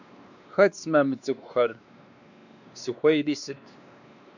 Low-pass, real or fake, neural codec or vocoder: 7.2 kHz; fake; codec, 16 kHz, 2 kbps, X-Codec, WavLM features, trained on Multilingual LibriSpeech